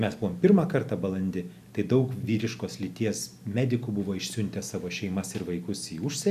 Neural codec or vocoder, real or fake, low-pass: none; real; 14.4 kHz